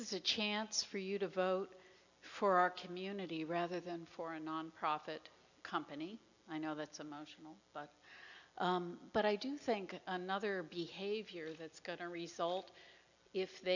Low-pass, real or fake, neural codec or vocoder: 7.2 kHz; real; none